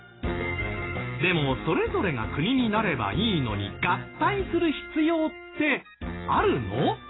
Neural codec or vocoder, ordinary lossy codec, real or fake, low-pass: none; AAC, 16 kbps; real; 7.2 kHz